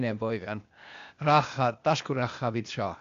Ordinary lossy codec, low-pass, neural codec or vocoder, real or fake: AAC, 64 kbps; 7.2 kHz; codec, 16 kHz, 0.8 kbps, ZipCodec; fake